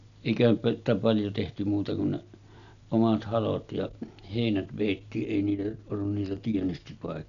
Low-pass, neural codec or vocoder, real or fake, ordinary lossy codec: 7.2 kHz; none; real; none